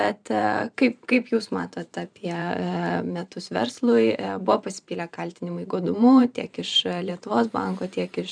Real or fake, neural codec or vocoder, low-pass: fake; vocoder, 44.1 kHz, 128 mel bands every 512 samples, BigVGAN v2; 9.9 kHz